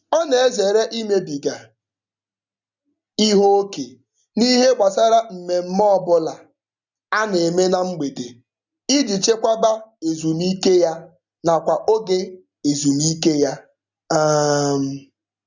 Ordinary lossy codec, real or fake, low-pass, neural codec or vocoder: none; real; 7.2 kHz; none